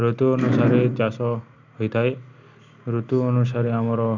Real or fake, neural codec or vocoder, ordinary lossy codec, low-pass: real; none; none; 7.2 kHz